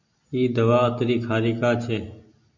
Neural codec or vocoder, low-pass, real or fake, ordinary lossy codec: none; 7.2 kHz; real; MP3, 64 kbps